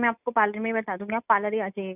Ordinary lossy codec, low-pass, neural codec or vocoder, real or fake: none; 3.6 kHz; none; real